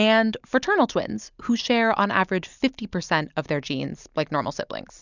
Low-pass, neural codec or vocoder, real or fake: 7.2 kHz; none; real